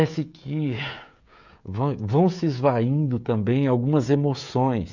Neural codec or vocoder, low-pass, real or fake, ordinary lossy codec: codec, 16 kHz, 16 kbps, FreqCodec, smaller model; 7.2 kHz; fake; none